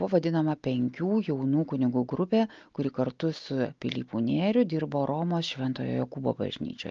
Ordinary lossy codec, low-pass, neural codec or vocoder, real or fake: Opus, 24 kbps; 7.2 kHz; none; real